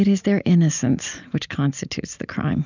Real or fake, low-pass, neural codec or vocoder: real; 7.2 kHz; none